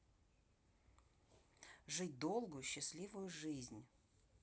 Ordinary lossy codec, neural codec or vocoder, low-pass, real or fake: none; none; none; real